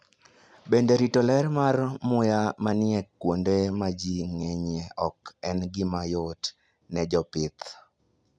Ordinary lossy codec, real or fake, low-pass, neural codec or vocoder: none; real; none; none